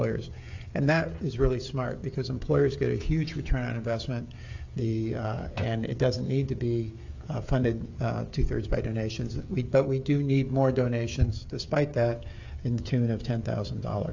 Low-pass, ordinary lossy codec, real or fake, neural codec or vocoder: 7.2 kHz; AAC, 48 kbps; fake; codec, 16 kHz, 16 kbps, FreqCodec, smaller model